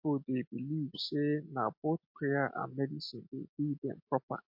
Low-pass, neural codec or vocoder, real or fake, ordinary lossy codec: 5.4 kHz; none; real; MP3, 32 kbps